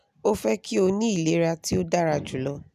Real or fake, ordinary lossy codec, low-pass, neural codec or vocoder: real; none; 14.4 kHz; none